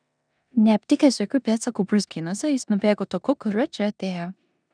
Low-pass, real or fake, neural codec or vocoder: 9.9 kHz; fake; codec, 16 kHz in and 24 kHz out, 0.9 kbps, LongCat-Audio-Codec, four codebook decoder